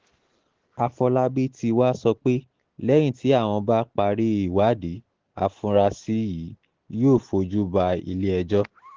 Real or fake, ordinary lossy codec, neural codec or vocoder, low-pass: real; Opus, 16 kbps; none; 7.2 kHz